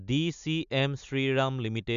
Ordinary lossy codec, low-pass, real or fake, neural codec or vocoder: AAC, 96 kbps; 7.2 kHz; real; none